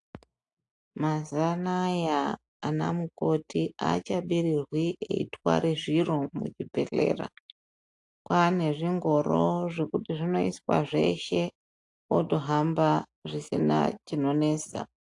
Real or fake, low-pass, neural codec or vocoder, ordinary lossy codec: real; 10.8 kHz; none; AAC, 64 kbps